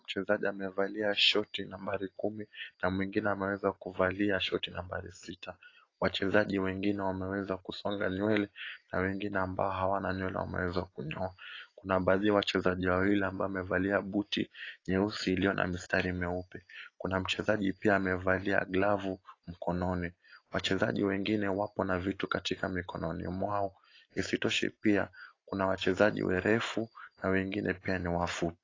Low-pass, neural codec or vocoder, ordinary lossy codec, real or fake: 7.2 kHz; none; AAC, 32 kbps; real